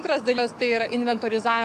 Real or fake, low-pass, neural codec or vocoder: fake; 14.4 kHz; codec, 44.1 kHz, 7.8 kbps, DAC